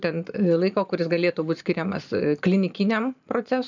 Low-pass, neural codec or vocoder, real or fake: 7.2 kHz; vocoder, 44.1 kHz, 128 mel bands every 512 samples, BigVGAN v2; fake